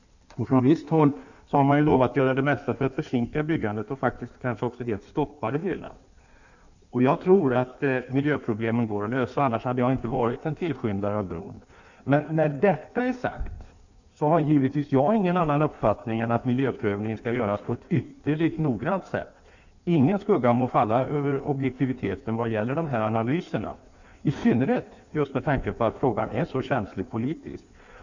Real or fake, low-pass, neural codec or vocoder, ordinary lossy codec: fake; 7.2 kHz; codec, 16 kHz in and 24 kHz out, 1.1 kbps, FireRedTTS-2 codec; none